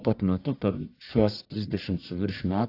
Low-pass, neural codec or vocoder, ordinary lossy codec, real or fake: 5.4 kHz; codec, 44.1 kHz, 1.7 kbps, Pupu-Codec; AAC, 32 kbps; fake